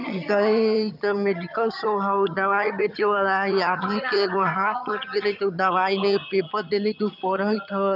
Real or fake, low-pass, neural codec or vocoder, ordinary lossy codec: fake; 5.4 kHz; vocoder, 22.05 kHz, 80 mel bands, HiFi-GAN; none